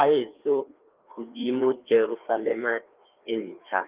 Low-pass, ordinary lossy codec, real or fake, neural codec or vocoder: 3.6 kHz; Opus, 32 kbps; fake; codec, 16 kHz, 2 kbps, FreqCodec, larger model